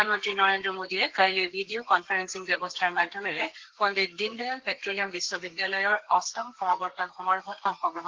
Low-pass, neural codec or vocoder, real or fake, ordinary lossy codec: 7.2 kHz; codec, 32 kHz, 1.9 kbps, SNAC; fake; Opus, 16 kbps